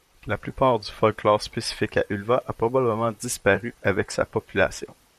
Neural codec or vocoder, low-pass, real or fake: vocoder, 44.1 kHz, 128 mel bands, Pupu-Vocoder; 14.4 kHz; fake